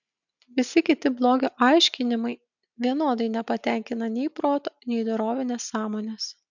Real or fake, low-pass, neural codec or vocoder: real; 7.2 kHz; none